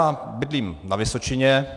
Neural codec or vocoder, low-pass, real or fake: none; 10.8 kHz; real